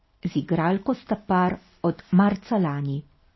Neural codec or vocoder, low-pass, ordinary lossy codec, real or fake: none; 7.2 kHz; MP3, 24 kbps; real